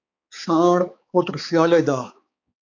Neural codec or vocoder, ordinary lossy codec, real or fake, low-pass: codec, 16 kHz, 4 kbps, X-Codec, HuBERT features, trained on balanced general audio; AAC, 48 kbps; fake; 7.2 kHz